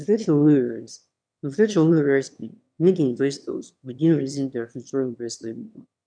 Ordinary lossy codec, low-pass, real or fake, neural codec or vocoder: none; 9.9 kHz; fake; autoencoder, 22.05 kHz, a latent of 192 numbers a frame, VITS, trained on one speaker